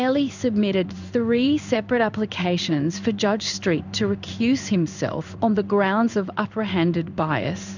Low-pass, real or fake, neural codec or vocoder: 7.2 kHz; fake; codec, 16 kHz in and 24 kHz out, 1 kbps, XY-Tokenizer